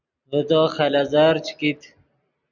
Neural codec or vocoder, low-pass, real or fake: none; 7.2 kHz; real